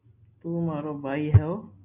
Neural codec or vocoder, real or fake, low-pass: none; real; 3.6 kHz